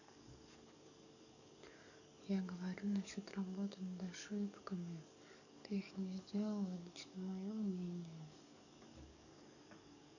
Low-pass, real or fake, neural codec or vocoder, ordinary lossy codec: 7.2 kHz; fake; codec, 44.1 kHz, 7.8 kbps, DAC; none